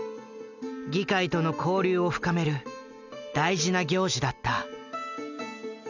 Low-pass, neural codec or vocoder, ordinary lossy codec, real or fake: 7.2 kHz; none; none; real